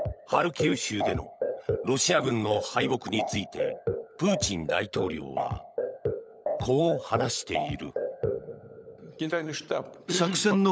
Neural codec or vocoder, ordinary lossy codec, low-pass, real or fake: codec, 16 kHz, 16 kbps, FunCodec, trained on LibriTTS, 50 frames a second; none; none; fake